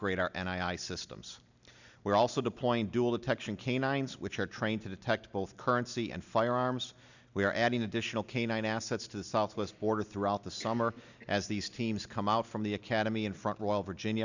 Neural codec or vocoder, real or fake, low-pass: none; real; 7.2 kHz